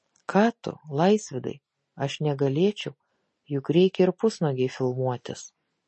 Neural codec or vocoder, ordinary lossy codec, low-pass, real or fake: none; MP3, 32 kbps; 10.8 kHz; real